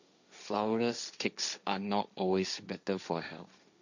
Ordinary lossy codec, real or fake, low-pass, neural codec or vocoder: none; fake; 7.2 kHz; codec, 16 kHz, 1.1 kbps, Voila-Tokenizer